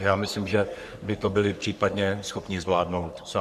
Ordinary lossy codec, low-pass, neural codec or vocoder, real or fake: MP3, 96 kbps; 14.4 kHz; codec, 44.1 kHz, 3.4 kbps, Pupu-Codec; fake